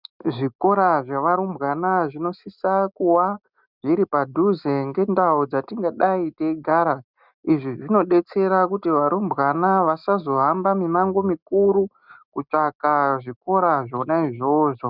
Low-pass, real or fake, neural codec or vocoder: 5.4 kHz; real; none